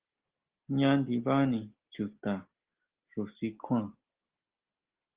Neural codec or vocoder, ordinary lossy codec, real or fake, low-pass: none; Opus, 16 kbps; real; 3.6 kHz